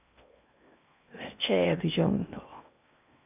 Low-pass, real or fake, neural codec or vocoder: 3.6 kHz; fake; codec, 16 kHz in and 24 kHz out, 0.8 kbps, FocalCodec, streaming, 65536 codes